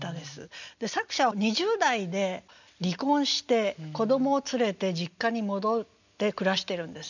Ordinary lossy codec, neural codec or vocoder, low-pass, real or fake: none; none; 7.2 kHz; real